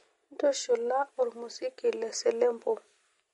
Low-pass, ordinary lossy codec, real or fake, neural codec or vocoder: 19.8 kHz; MP3, 48 kbps; real; none